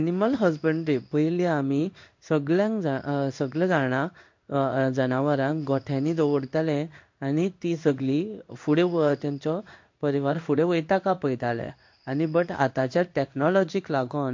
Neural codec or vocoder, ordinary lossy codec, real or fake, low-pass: codec, 16 kHz in and 24 kHz out, 1 kbps, XY-Tokenizer; MP3, 48 kbps; fake; 7.2 kHz